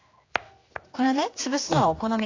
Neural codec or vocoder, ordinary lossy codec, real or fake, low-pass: codec, 16 kHz, 2 kbps, X-Codec, HuBERT features, trained on general audio; AAC, 32 kbps; fake; 7.2 kHz